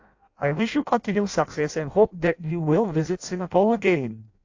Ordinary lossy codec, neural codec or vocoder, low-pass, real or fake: AAC, 48 kbps; codec, 16 kHz in and 24 kHz out, 0.6 kbps, FireRedTTS-2 codec; 7.2 kHz; fake